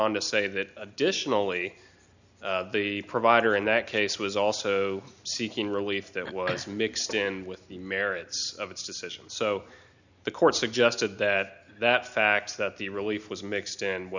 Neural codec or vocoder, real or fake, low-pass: none; real; 7.2 kHz